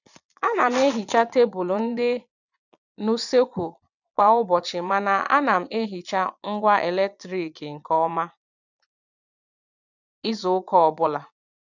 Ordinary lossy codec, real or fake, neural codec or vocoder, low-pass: none; fake; vocoder, 22.05 kHz, 80 mel bands, Vocos; 7.2 kHz